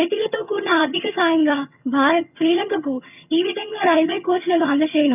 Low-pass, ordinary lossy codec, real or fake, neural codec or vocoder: 3.6 kHz; none; fake; vocoder, 22.05 kHz, 80 mel bands, HiFi-GAN